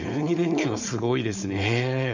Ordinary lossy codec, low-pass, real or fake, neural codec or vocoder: none; 7.2 kHz; fake; codec, 16 kHz, 4.8 kbps, FACodec